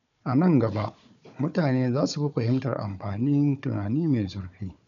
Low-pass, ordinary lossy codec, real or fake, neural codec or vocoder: 7.2 kHz; none; fake; codec, 16 kHz, 4 kbps, FunCodec, trained on Chinese and English, 50 frames a second